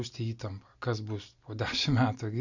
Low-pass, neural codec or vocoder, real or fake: 7.2 kHz; none; real